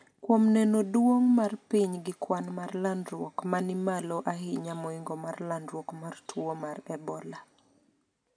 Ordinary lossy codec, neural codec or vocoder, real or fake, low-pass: none; none; real; 9.9 kHz